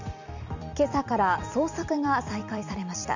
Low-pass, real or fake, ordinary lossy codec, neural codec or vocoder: 7.2 kHz; fake; none; vocoder, 44.1 kHz, 128 mel bands every 256 samples, BigVGAN v2